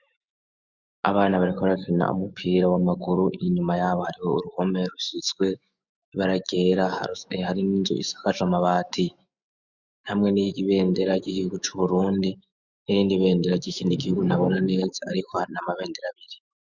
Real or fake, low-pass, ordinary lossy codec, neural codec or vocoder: fake; 7.2 kHz; Opus, 64 kbps; autoencoder, 48 kHz, 128 numbers a frame, DAC-VAE, trained on Japanese speech